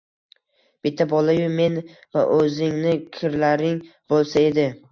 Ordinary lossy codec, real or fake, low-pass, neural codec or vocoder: MP3, 64 kbps; real; 7.2 kHz; none